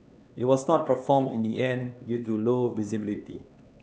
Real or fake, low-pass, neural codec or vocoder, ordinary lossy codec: fake; none; codec, 16 kHz, 2 kbps, X-Codec, HuBERT features, trained on LibriSpeech; none